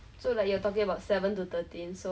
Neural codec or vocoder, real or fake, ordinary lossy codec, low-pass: none; real; none; none